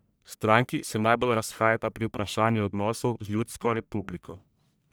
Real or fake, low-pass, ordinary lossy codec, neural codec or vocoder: fake; none; none; codec, 44.1 kHz, 1.7 kbps, Pupu-Codec